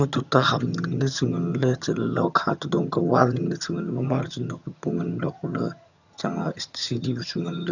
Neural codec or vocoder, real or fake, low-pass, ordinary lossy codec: vocoder, 22.05 kHz, 80 mel bands, HiFi-GAN; fake; 7.2 kHz; none